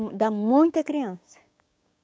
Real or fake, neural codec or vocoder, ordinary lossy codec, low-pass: fake; codec, 16 kHz, 6 kbps, DAC; none; none